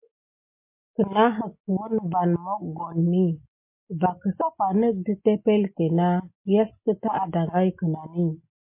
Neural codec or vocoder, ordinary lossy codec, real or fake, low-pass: none; MP3, 24 kbps; real; 3.6 kHz